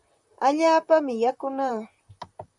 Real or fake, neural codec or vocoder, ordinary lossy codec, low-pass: fake; vocoder, 44.1 kHz, 128 mel bands, Pupu-Vocoder; MP3, 96 kbps; 10.8 kHz